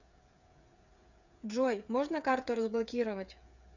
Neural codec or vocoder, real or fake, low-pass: codec, 16 kHz, 8 kbps, FreqCodec, smaller model; fake; 7.2 kHz